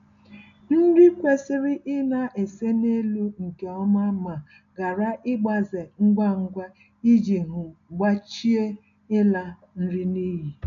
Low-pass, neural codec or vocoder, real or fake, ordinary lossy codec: 7.2 kHz; none; real; none